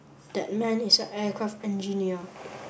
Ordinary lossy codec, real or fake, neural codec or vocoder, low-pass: none; real; none; none